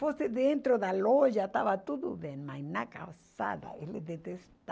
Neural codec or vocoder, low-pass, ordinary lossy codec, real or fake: none; none; none; real